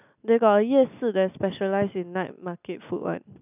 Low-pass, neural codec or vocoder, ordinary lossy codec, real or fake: 3.6 kHz; none; none; real